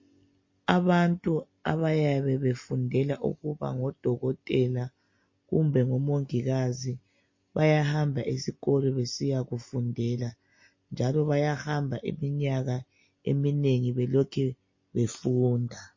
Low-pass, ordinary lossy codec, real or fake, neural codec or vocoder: 7.2 kHz; MP3, 32 kbps; real; none